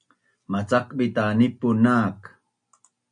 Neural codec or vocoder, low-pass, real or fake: none; 9.9 kHz; real